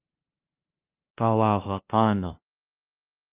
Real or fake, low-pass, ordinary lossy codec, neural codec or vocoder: fake; 3.6 kHz; Opus, 24 kbps; codec, 16 kHz, 0.5 kbps, FunCodec, trained on LibriTTS, 25 frames a second